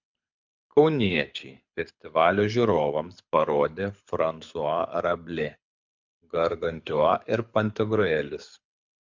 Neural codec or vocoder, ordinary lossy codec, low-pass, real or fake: codec, 24 kHz, 6 kbps, HILCodec; MP3, 64 kbps; 7.2 kHz; fake